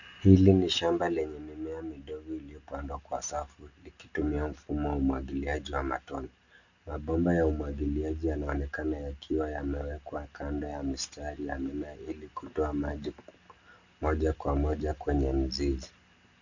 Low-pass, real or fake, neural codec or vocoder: 7.2 kHz; real; none